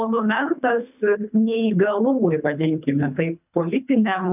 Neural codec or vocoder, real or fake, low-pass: codec, 24 kHz, 3 kbps, HILCodec; fake; 3.6 kHz